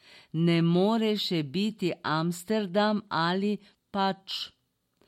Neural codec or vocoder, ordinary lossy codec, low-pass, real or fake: none; MP3, 64 kbps; 19.8 kHz; real